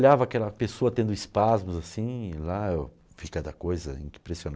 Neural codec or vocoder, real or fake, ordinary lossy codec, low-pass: none; real; none; none